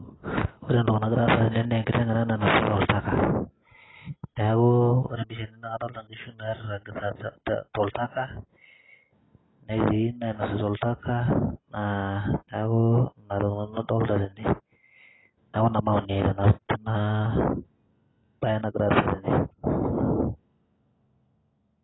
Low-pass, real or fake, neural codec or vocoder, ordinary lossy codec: 7.2 kHz; real; none; AAC, 16 kbps